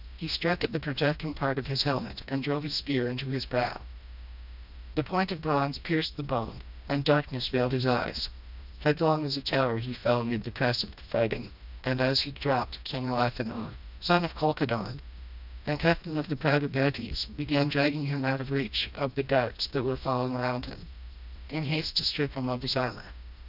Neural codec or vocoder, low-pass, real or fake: codec, 16 kHz, 1 kbps, FreqCodec, smaller model; 5.4 kHz; fake